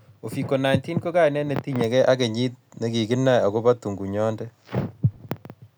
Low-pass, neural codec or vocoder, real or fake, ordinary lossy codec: none; none; real; none